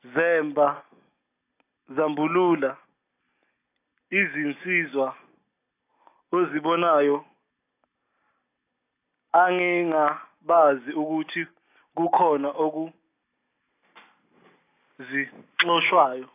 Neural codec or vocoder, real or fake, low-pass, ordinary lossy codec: none; real; 3.6 kHz; AAC, 24 kbps